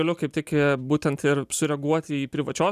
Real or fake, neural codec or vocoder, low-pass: real; none; 14.4 kHz